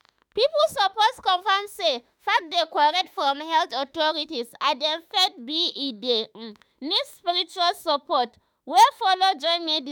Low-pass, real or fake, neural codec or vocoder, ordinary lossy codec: none; fake; autoencoder, 48 kHz, 32 numbers a frame, DAC-VAE, trained on Japanese speech; none